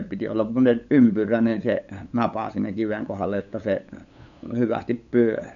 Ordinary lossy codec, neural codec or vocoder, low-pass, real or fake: none; codec, 16 kHz, 8 kbps, FunCodec, trained on LibriTTS, 25 frames a second; 7.2 kHz; fake